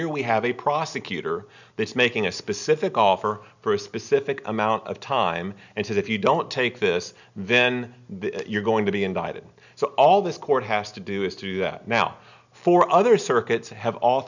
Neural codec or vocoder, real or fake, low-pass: none; real; 7.2 kHz